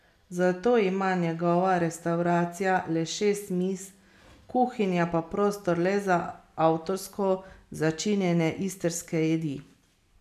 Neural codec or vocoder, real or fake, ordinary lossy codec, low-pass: none; real; none; 14.4 kHz